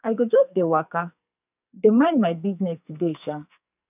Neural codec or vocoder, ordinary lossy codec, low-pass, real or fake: codec, 44.1 kHz, 2.6 kbps, SNAC; none; 3.6 kHz; fake